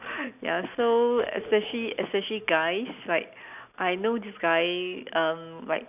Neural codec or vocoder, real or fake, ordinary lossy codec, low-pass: none; real; none; 3.6 kHz